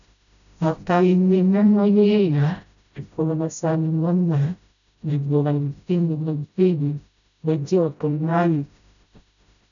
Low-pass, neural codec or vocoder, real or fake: 7.2 kHz; codec, 16 kHz, 0.5 kbps, FreqCodec, smaller model; fake